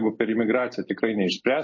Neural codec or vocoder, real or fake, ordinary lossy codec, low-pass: none; real; MP3, 32 kbps; 7.2 kHz